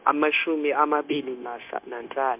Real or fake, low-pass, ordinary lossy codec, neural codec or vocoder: fake; 3.6 kHz; MP3, 32 kbps; codec, 16 kHz, 0.9 kbps, LongCat-Audio-Codec